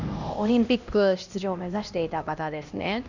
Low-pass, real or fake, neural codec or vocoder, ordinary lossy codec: 7.2 kHz; fake; codec, 16 kHz, 1 kbps, X-Codec, HuBERT features, trained on LibriSpeech; none